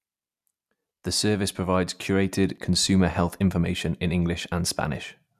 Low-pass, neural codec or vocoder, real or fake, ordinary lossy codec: 14.4 kHz; none; real; none